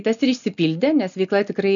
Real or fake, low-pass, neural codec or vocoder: real; 7.2 kHz; none